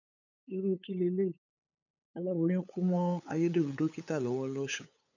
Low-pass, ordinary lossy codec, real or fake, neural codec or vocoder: 7.2 kHz; none; fake; codec, 16 kHz, 8 kbps, FunCodec, trained on LibriTTS, 25 frames a second